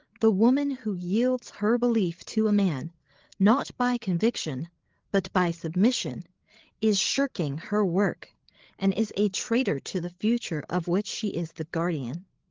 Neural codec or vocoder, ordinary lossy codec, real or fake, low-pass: codec, 16 kHz, 8 kbps, FreqCodec, larger model; Opus, 16 kbps; fake; 7.2 kHz